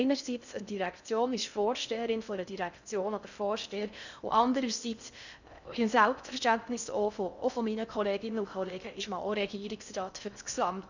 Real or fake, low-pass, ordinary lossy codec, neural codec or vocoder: fake; 7.2 kHz; none; codec, 16 kHz in and 24 kHz out, 0.6 kbps, FocalCodec, streaming, 2048 codes